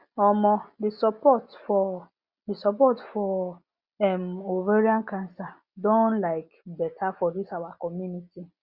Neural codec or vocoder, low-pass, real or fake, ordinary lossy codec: none; 5.4 kHz; real; Opus, 64 kbps